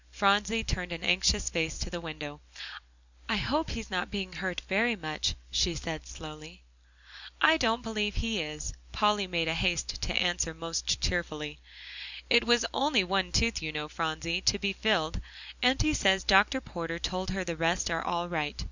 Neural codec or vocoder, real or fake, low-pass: none; real; 7.2 kHz